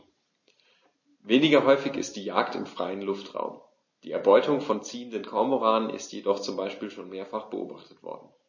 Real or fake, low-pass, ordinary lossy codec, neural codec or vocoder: real; 7.2 kHz; MP3, 32 kbps; none